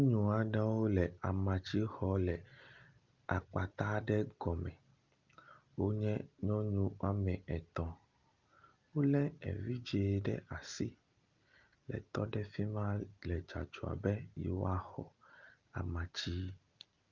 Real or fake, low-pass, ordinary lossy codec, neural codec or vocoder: real; 7.2 kHz; Opus, 24 kbps; none